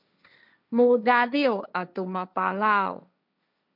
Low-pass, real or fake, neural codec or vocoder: 5.4 kHz; fake; codec, 16 kHz, 1.1 kbps, Voila-Tokenizer